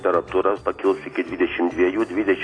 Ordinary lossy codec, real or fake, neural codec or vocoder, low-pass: AAC, 32 kbps; real; none; 9.9 kHz